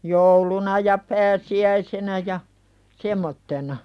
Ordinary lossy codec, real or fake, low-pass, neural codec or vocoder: none; real; none; none